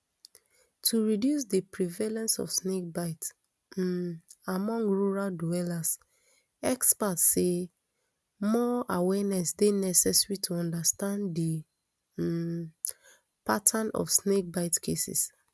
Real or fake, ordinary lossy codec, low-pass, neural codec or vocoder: real; none; none; none